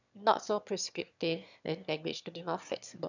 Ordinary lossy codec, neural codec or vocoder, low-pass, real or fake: none; autoencoder, 22.05 kHz, a latent of 192 numbers a frame, VITS, trained on one speaker; 7.2 kHz; fake